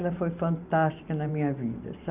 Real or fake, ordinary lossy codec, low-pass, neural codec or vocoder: fake; none; 3.6 kHz; vocoder, 44.1 kHz, 128 mel bands every 512 samples, BigVGAN v2